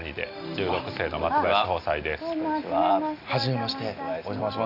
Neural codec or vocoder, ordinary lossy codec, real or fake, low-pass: none; none; real; 5.4 kHz